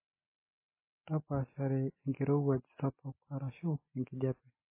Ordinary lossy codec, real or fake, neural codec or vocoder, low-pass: MP3, 16 kbps; real; none; 3.6 kHz